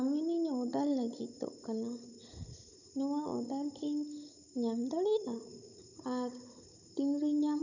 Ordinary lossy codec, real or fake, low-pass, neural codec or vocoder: none; fake; 7.2 kHz; codec, 16 kHz, 16 kbps, FunCodec, trained on Chinese and English, 50 frames a second